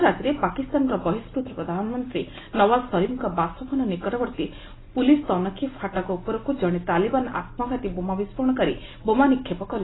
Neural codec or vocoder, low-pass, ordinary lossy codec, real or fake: none; 7.2 kHz; AAC, 16 kbps; real